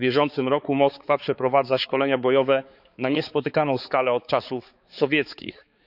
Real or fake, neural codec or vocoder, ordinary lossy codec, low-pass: fake; codec, 16 kHz, 4 kbps, X-Codec, HuBERT features, trained on balanced general audio; none; 5.4 kHz